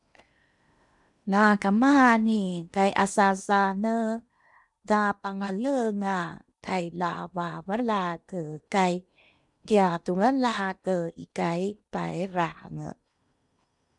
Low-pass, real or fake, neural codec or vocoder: 10.8 kHz; fake; codec, 16 kHz in and 24 kHz out, 0.8 kbps, FocalCodec, streaming, 65536 codes